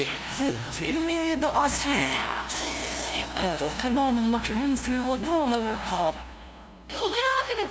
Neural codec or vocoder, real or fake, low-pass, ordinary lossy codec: codec, 16 kHz, 0.5 kbps, FunCodec, trained on LibriTTS, 25 frames a second; fake; none; none